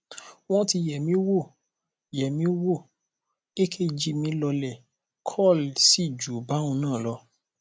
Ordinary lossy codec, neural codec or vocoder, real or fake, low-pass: none; none; real; none